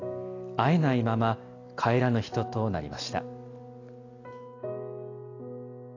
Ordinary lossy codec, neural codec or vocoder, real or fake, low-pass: AAC, 32 kbps; none; real; 7.2 kHz